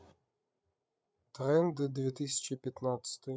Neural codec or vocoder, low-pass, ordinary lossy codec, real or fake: codec, 16 kHz, 8 kbps, FreqCodec, larger model; none; none; fake